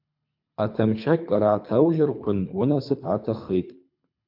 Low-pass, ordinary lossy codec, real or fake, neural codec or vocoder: 5.4 kHz; MP3, 48 kbps; fake; codec, 24 kHz, 3 kbps, HILCodec